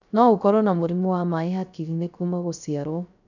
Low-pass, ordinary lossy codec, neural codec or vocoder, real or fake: 7.2 kHz; none; codec, 16 kHz, 0.3 kbps, FocalCodec; fake